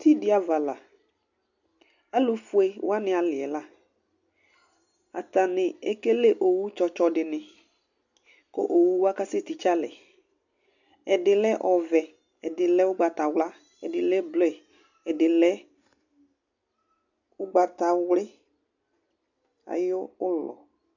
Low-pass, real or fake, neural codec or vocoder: 7.2 kHz; real; none